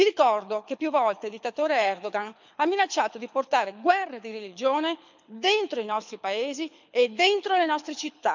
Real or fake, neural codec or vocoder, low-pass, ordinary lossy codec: fake; codec, 24 kHz, 6 kbps, HILCodec; 7.2 kHz; MP3, 64 kbps